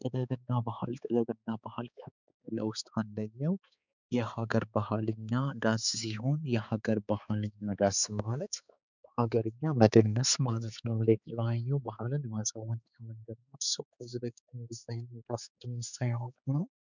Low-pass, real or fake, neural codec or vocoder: 7.2 kHz; fake; codec, 16 kHz, 4 kbps, X-Codec, HuBERT features, trained on balanced general audio